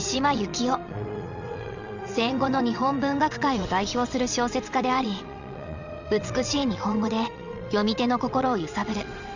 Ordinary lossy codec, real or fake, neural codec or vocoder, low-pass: none; fake; vocoder, 22.05 kHz, 80 mel bands, WaveNeXt; 7.2 kHz